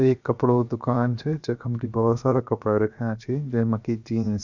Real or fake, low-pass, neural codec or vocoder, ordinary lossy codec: fake; 7.2 kHz; codec, 16 kHz, 0.7 kbps, FocalCodec; none